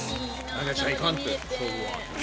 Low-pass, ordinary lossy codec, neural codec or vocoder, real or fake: none; none; none; real